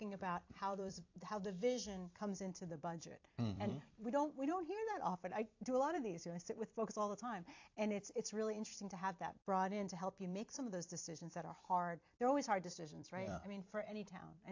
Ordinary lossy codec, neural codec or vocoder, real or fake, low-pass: AAC, 48 kbps; vocoder, 44.1 kHz, 128 mel bands every 256 samples, BigVGAN v2; fake; 7.2 kHz